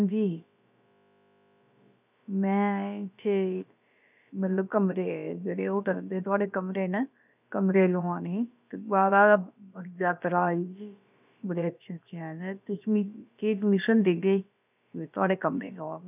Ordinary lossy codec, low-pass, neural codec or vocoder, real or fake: none; 3.6 kHz; codec, 16 kHz, about 1 kbps, DyCAST, with the encoder's durations; fake